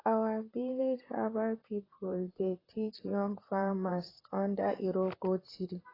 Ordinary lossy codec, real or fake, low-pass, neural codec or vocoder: AAC, 24 kbps; fake; 5.4 kHz; vocoder, 44.1 kHz, 128 mel bands, Pupu-Vocoder